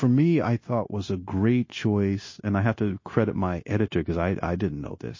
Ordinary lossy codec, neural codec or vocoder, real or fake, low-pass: MP3, 32 kbps; codec, 16 kHz, 0.9 kbps, LongCat-Audio-Codec; fake; 7.2 kHz